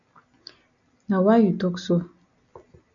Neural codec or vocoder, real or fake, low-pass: none; real; 7.2 kHz